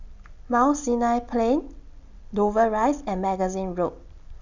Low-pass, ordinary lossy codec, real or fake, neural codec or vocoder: 7.2 kHz; none; real; none